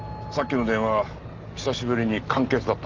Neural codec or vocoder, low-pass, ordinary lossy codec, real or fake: none; 7.2 kHz; Opus, 32 kbps; real